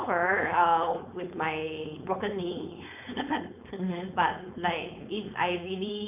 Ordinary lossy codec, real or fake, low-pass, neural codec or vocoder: none; fake; 3.6 kHz; codec, 16 kHz, 4.8 kbps, FACodec